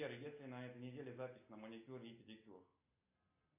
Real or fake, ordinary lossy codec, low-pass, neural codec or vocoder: real; AAC, 24 kbps; 3.6 kHz; none